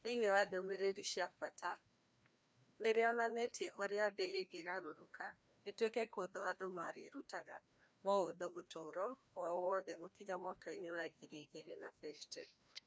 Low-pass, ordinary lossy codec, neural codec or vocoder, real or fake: none; none; codec, 16 kHz, 1 kbps, FreqCodec, larger model; fake